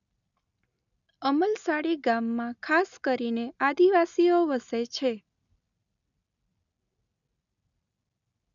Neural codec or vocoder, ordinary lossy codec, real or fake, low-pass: none; none; real; 7.2 kHz